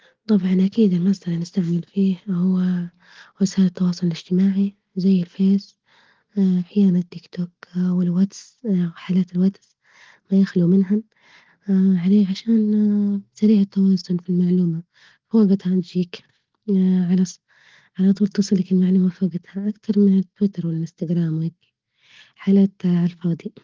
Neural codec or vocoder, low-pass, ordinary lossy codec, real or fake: none; 7.2 kHz; Opus, 16 kbps; real